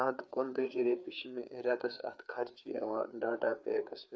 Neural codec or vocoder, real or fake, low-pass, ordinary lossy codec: codec, 16 kHz, 4 kbps, FreqCodec, larger model; fake; none; none